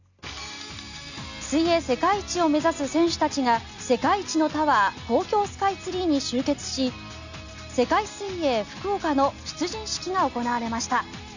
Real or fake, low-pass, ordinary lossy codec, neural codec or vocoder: real; 7.2 kHz; none; none